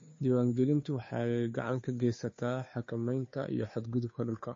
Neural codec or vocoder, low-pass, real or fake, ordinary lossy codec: codec, 16 kHz, 2 kbps, FunCodec, trained on Chinese and English, 25 frames a second; 7.2 kHz; fake; MP3, 32 kbps